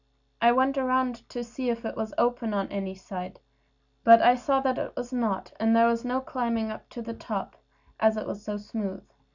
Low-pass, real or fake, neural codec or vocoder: 7.2 kHz; real; none